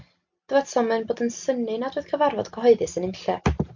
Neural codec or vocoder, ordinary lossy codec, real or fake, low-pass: none; MP3, 48 kbps; real; 7.2 kHz